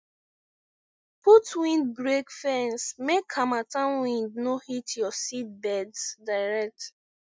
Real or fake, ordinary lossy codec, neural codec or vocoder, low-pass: real; none; none; none